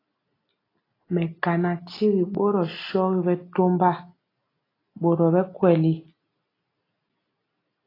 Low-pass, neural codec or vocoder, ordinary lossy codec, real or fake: 5.4 kHz; none; AAC, 24 kbps; real